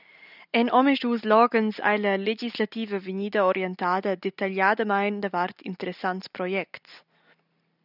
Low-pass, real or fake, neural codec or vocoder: 5.4 kHz; real; none